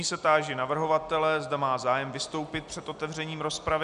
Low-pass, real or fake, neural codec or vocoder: 10.8 kHz; real; none